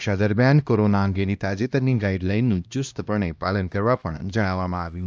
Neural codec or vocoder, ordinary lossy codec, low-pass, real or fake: codec, 16 kHz, 1 kbps, X-Codec, HuBERT features, trained on LibriSpeech; none; none; fake